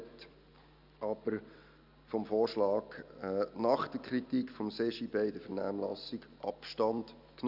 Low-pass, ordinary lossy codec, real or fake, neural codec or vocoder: 5.4 kHz; none; real; none